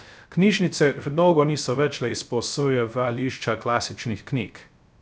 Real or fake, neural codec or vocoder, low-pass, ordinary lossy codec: fake; codec, 16 kHz, 0.3 kbps, FocalCodec; none; none